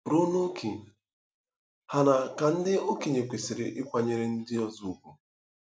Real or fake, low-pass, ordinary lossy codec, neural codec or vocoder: real; none; none; none